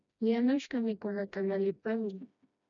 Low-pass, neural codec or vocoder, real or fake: 7.2 kHz; codec, 16 kHz, 1 kbps, FreqCodec, smaller model; fake